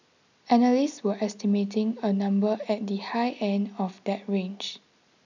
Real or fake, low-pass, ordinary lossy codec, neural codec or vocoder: real; 7.2 kHz; none; none